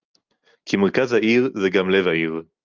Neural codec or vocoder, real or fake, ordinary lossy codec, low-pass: none; real; Opus, 24 kbps; 7.2 kHz